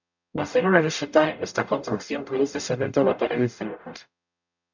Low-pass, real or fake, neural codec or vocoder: 7.2 kHz; fake; codec, 44.1 kHz, 0.9 kbps, DAC